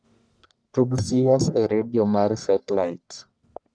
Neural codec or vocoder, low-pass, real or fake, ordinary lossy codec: codec, 44.1 kHz, 1.7 kbps, Pupu-Codec; 9.9 kHz; fake; none